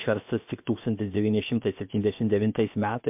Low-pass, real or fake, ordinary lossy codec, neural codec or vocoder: 3.6 kHz; fake; MP3, 32 kbps; codec, 16 kHz, about 1 kbps, DyCAST, with the encoder's durations